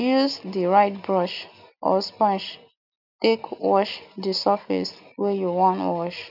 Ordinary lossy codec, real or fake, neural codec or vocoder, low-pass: none; real; none; 5.4 kHz